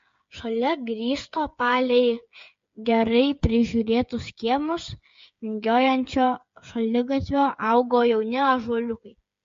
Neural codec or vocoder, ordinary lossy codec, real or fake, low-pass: codec, 16 kHz, 8 kbps, FreqCodec, smaller model; MP3, 48 kbps; fake; 7.2 kHz